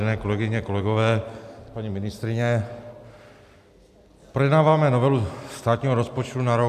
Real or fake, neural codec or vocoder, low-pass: real; none; 14.4 kHz